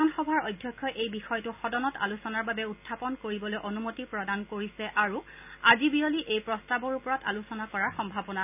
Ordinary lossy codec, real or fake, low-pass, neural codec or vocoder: none; real; 3.6 kHz; none